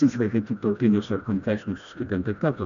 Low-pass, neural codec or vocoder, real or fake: 7.2 kHz; codec, 16 kHz, 1 kbps, FreqCodec, smaller model; fake